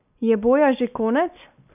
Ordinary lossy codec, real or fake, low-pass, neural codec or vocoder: none; real; 3.6 kHz; none